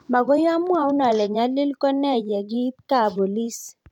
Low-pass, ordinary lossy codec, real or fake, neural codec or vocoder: 19.8 kHz; none; fake; vocoder, 44.1 kHz, 128 mel bands every 512 samples, BigVGAN v2